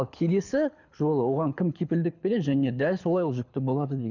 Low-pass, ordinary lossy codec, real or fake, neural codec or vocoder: 7.2 kHz; none; fake; codec, 24 kHz, 6 kbps, HILCodec